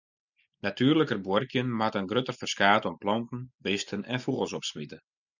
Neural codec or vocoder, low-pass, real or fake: none; 7.2 kHz; real